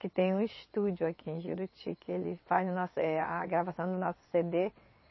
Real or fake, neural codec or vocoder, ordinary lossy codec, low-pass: fake; vocoder, 22.05 kHz, 80 mel bands, WaveNeXt; MP3, 24 kbps; 7.2 kHz